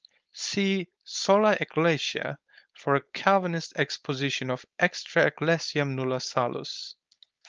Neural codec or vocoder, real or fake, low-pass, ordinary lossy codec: codec, 16 kHz, 4.8 kbps, FACodec; fake; 7.2 kHz; Opus, 24 kbps